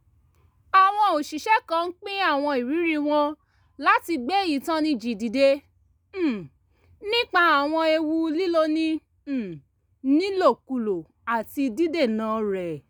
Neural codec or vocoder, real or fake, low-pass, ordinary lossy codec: none; real; none; none